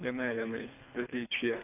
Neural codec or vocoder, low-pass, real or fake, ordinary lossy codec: codec, 24 kHz, 3 kbps, HILCodec; 3.6 kHz; fake; none